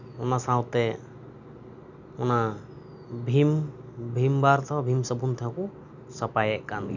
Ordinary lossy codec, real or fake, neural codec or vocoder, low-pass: none; real; none; 7.2 kHz